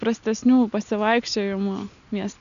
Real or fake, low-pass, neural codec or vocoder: real; 7.2 kHz; none